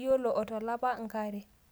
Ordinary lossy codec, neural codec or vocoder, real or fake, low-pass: none; none; real; none